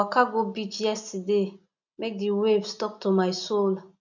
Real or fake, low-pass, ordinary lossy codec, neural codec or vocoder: real; 7.2 kHz; none; none